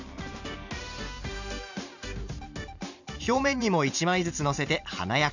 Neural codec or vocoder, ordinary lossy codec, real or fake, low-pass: vocoder, 44.1 kHz, 128 mel bands every 512 samples, BigVGAN v2; none; fake; 7.2 kHz